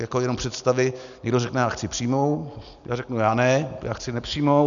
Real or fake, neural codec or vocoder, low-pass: real; none; 7.2 kHz